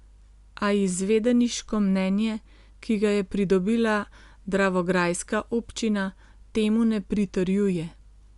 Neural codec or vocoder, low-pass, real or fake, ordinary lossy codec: none; 10.8 kHz; real; Opus, 64 kbps